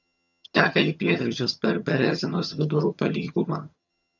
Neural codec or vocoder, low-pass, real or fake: vocoder, 22.05 kHz, 80 mel bands, HiFi-GAN; 7.2 kHz; fake